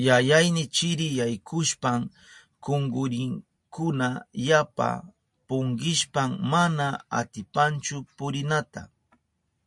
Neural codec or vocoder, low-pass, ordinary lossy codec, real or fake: none; 10.8 kHz; MP3, 64 kbps; real